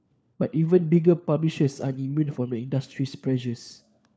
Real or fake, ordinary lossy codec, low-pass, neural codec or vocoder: fake; none; none; codec, 16 kHz, 4 kbps, FunCodec, trained on LibriTTS, 50 frames a second